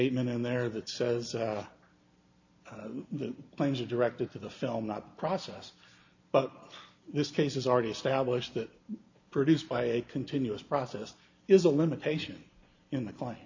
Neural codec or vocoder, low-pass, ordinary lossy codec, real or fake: none; 7.2 kHz; AAC, 48 kbps; real